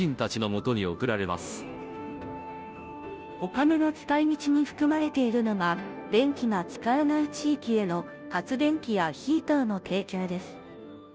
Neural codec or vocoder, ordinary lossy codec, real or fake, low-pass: codec, 16 kHz, 0.5 kbps, FunCodec, trained on Chinese and English, 25 frames a second; none; fake; none